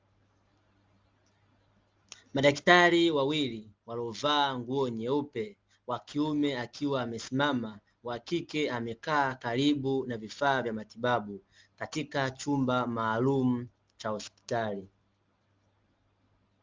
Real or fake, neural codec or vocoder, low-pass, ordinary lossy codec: real; none; 7.2 kHz; Opus, 32 kbps